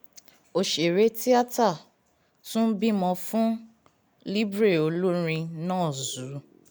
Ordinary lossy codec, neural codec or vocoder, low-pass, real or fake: none; none; none; real